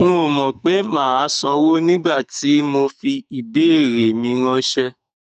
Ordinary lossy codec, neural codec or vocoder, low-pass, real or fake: none; codec, 44.1 kHz, 2.6 kbps, SNAC; 14.4 kHz; fake